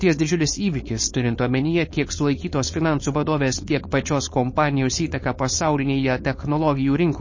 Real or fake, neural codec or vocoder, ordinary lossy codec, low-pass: fake; codec, 16 kHz, 4.8 kbps, FACodec; MP3, 32 kbps; 7.2 kHz